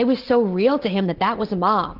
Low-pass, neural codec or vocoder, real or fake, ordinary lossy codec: 5.4 kHz; none; real; Opus, 16 kbps